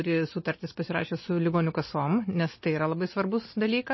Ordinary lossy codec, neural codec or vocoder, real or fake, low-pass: MP3, 24 kbps; none; real; 7.2 kHz